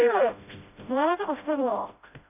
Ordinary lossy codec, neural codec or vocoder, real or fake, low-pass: none; codec, 16 kHz, 0.5 kbps, FreqCodec, smaller model; fake; 3.6 kHz